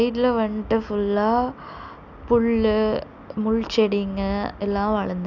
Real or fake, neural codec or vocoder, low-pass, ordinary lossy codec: real; none; 7.2 kHz; none